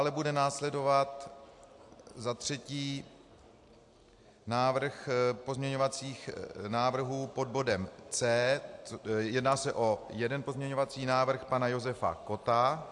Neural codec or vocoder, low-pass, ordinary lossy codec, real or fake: none; 10.8 kHz; AAC, 64 kbps; real